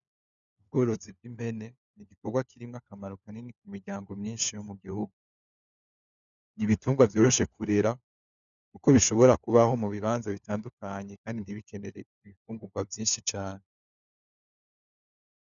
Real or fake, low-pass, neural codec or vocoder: fake; 7.2 kHz; codec, 16 kHz, 4 kbps, FunCodec, trained on LibriTTS, 50 frames a second